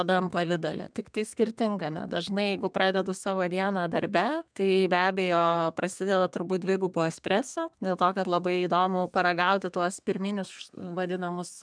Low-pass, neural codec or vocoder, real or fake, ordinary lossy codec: 9.9 kHz; codec, 32 kHz, 1.9 kbps, SNAC; fake; MP3, 96 kbps